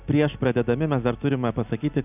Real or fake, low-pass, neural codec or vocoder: real; 3.6 kHz; none